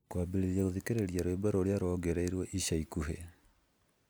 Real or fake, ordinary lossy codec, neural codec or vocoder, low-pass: real; none; none; none